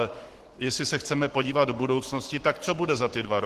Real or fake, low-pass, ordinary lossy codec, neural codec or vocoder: fake; 14.4 kHz; Opus, 16 kbps; codec, 44.1 kHz, 7.8 kbps, Pupu-Codec